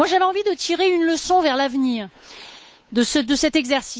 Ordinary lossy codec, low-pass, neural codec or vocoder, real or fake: none; none; codec, 16 kHz, 8 kbps, FunCodec, trained on Chinese and English, 25 frames a second; fake